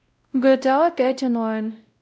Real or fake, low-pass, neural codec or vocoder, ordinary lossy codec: fake; none; codec, 16 kHz, 0.5 kbps, X-Codec, WavLM features, trained on Multilingual LibriSpeech; none